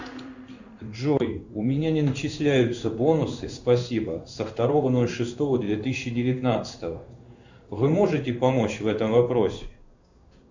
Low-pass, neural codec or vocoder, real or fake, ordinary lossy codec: 7.2 kHz; codec, 16 kHz in and 24 kHz out, 1 kbps, XY-Tokenizer; fake; Opus, 64 kbps